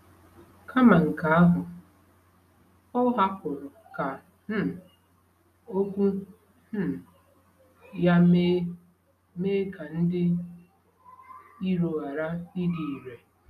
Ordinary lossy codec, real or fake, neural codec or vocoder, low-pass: none; real; none; 14.4 kHz